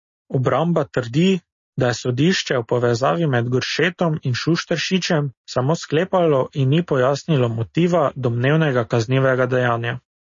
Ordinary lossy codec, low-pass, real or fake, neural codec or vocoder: MP3, 32 kbps; 7.2 kHz; real; none